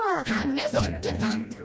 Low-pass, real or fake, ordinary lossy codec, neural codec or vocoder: none; fake; none; codec, 16 kHz, 1 kbps, FreqCodec, smaller model